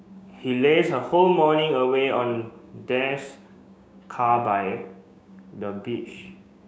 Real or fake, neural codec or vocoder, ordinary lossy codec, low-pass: fake; codec, 16 kHz, 6 kbps, DAC; none; none